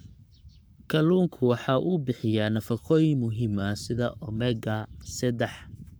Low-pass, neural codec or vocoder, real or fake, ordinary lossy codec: none; codec, 44.1 kHz, 7.8 kbps, Pupu-Codec; fake; none